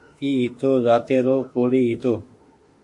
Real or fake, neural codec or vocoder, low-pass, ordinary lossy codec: fake; autoencoder, 48 kHz, 32 numbers a frame, DAC-VAE, trained on Japanese speech; 10.8 kHz; MP3, 48 kbps